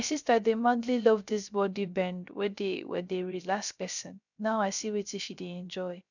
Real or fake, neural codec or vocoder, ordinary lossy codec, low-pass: fake; codec, 16 kHz, 0.3 kbps, FocalCodec; none; 7.2 kHz